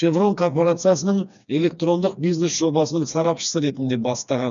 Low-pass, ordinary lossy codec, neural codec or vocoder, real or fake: 7.2 kHz; none; codec, 16 kHz, 2 kbps, FreqCodec, smaller model; fake